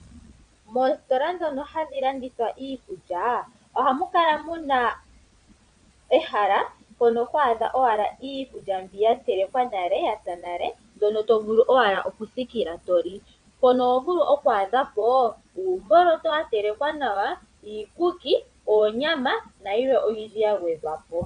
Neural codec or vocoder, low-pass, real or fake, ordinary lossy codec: vocoder, 22.05 kHz, 80 mel bands, Vocos; 9.9 kHz; fake; AAC, 96 kbps